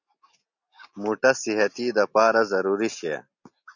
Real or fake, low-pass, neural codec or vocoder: real; 7.2 kHz; none